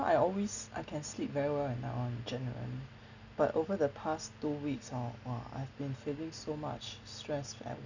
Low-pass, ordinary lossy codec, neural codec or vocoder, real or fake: 7.2 kHz; none; none; real